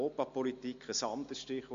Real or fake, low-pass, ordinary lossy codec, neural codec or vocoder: real; 7.2 kHz; MP3, 48 kbps; none